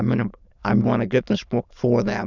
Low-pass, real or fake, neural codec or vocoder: 7.2 kHz; fake; autoencoder, 22.05 kHz, a latent of 192 numbers a frame, VITS, trained on many speakers